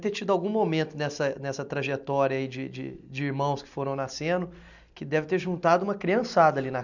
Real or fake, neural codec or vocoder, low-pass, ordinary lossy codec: real; none; 7.2 kHz; none